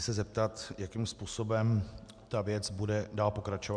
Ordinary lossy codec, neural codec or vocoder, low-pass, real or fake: MP3, 96 kbps; none; 9.9 kHz; real